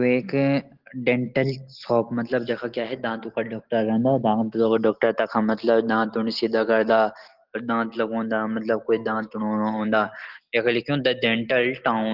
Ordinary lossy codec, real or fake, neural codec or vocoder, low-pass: Opus, 16 kbps; real; none; 5.4 kHz